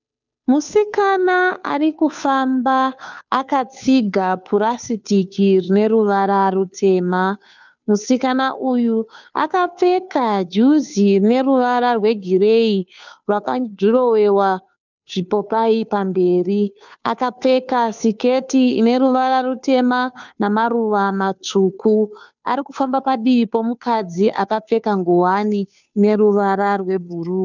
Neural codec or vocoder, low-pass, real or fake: codec, 16 kHz, 2 kbps, FunCodec, trained on Chinese and English, 25 frames a second; 7.2 kHz; fake